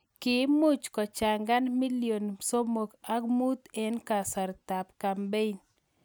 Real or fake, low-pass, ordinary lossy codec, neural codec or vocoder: real; none; none; none